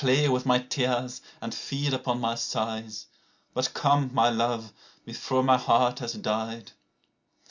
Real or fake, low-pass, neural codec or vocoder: real; 7.2 kHz; none